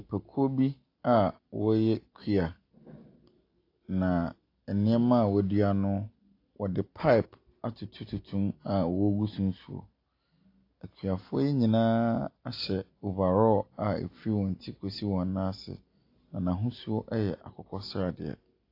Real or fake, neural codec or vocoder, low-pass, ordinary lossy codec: real; none; 5.4 kHz; AAC, 32 kbps